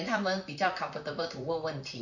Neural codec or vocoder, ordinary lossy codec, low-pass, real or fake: vocoder, 44.1 kHz, 128 mel bands, Pupu-Vocoder; none; 7.2 kHz; fake